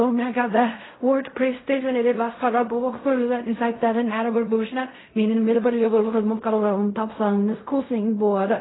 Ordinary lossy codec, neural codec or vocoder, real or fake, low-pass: AAC, 16 kbps; codec, 16 kHz in and 24 kHz out, 0.4 kbps, LongCat-Audio-Codec, fine tuned four codebook decoder; fake; 7.2 kHz